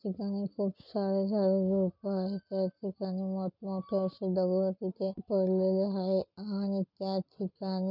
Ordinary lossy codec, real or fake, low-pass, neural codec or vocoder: none; real; 5.4 kHz; none